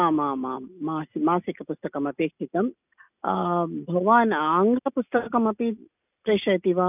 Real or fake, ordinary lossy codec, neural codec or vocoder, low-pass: real; none; none; 3.6 kHz